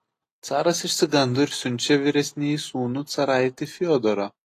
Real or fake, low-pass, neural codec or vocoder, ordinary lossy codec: real; 14.4 kHz; none; AAC, 48 kbps